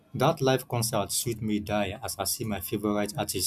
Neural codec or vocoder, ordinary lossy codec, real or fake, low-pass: none; none; real; 14.4 kHz